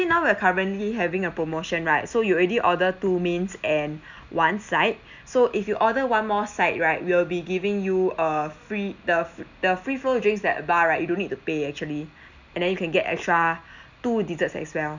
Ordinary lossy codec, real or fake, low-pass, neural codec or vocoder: none; real; 7.2 kHz; none